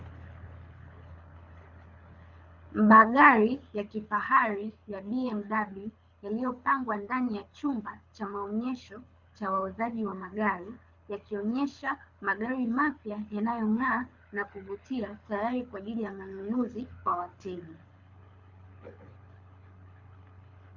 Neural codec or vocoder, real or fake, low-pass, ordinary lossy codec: codec, 24 kHz, 6 kbps, HILCodec; fake; 7.2 kHz; Opus, 64 kbps